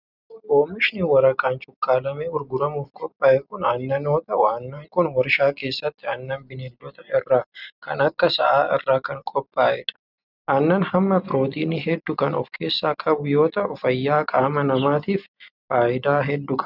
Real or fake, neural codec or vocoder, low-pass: real; none; 5.4 kHz